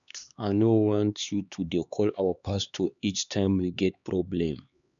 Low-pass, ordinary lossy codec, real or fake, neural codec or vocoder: 7.2 kHz; none; fake; codec, 16 kHz, 2 kbps, X-Codec, HuBERT features, trained on LibriSpeech